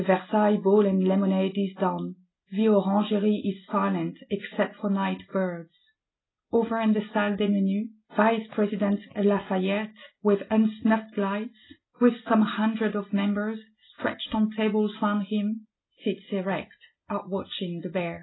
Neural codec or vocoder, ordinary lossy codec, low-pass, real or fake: none; AAC, 16 kbps; 7.2 kHz; real